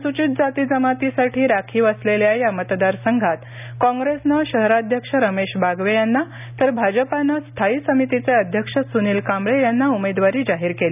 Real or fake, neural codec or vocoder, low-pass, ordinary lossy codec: real; none; 3.6 kHz; none